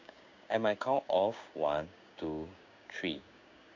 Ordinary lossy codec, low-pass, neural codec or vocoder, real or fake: AAC, 48 kbps; 7.2 kHz; codec, 16 kHz, 6 kbps, DAC; fake